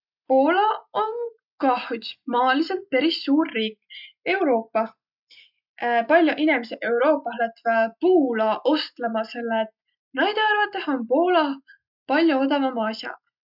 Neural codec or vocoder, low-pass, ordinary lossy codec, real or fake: none; 5.4 kHz; none; real